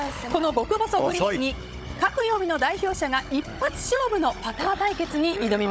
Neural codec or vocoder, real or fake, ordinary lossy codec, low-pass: codec, 16 kHz, 16 kbps, FunCodec, trained on Chinese and English, 50 frames a second; fake; none; none